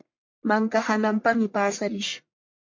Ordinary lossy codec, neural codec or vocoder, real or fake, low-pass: MP3, 48 kbps; codec, 44.1 kHz, 1.7 kbps, Pupu-Codec; fake; 7.2 kHz